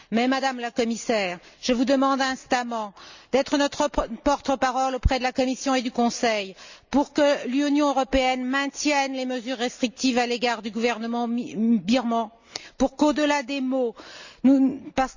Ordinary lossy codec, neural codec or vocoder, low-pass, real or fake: Opus, 64 kbps; none; 7.2 kHz; real